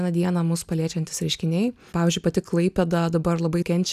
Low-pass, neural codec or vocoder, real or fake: 14.4 kHz; none; real